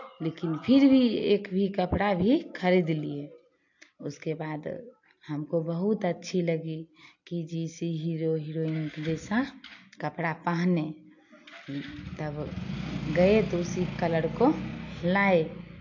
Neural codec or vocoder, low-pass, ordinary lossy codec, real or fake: none; 7.2 kHz; AAC, 48 kbps; real